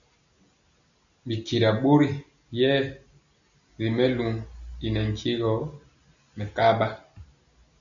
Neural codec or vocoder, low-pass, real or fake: none; 7.2 kHz; real